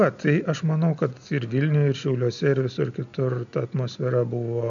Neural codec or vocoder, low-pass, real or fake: none; 7.2 kHz; real